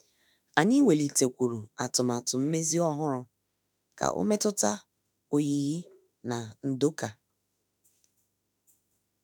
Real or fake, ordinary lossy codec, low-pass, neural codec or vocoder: fake; none; none; autoencoder, 48 kHz, 32 numbers a frame, DAC-VAE, trained on Japanese speech